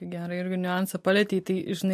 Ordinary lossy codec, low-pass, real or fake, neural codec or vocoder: MP3, 64 kbps; 14.4 kHz; real; none